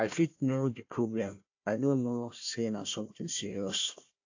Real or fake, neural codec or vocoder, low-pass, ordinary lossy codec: fake; codec, 16 kHz, 1 kbps, FunCodec, trained on Chinese and English, 50 frames a second; 7.2 kHz; AAC, 48 kbps